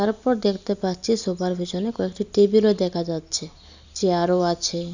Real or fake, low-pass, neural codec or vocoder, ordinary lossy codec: real; 7.2 kHz; none; none